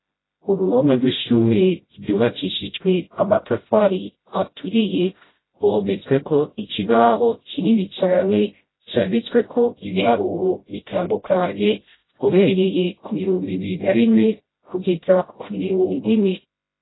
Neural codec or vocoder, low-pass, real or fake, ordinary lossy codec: codec, 16 kHz, 0.5 kbps, FreqCodec, smaller model; 7.2 kHz; fake; AAC, 16 kbps